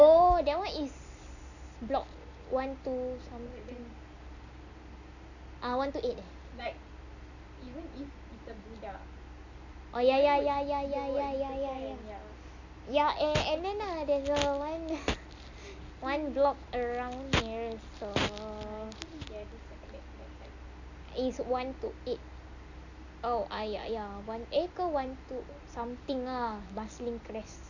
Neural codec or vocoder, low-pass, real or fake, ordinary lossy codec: none; 7.2 kHz; real; none